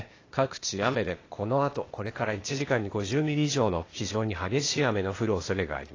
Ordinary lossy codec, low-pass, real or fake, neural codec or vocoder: AAC, 32 kbps; 7.2 kHz; fake; codec, 16 kHz, 0.8 kbps, ZipCodec